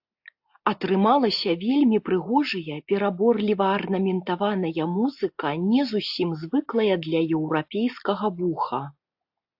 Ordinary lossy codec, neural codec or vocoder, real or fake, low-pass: AAC, 48 kbps; none; real; 5.4 kHz